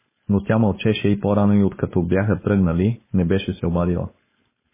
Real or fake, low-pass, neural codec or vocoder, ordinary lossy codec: fake; 3.6 kHz; codec, 16 kHz, 4.8 kbps, FACodec; MP3, 16 kbps